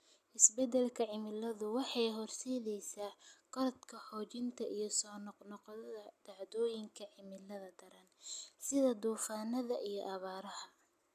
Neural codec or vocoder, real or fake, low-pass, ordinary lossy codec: none; real; 14.4 kHz; none